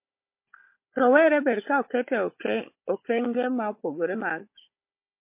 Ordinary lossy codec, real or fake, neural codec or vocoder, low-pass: MP3, 24 kbps; fake; codec, 16 kHz, 16 kbps, FunCodec, trained on Chinese and English, 50 frames a second; 3.6 kHz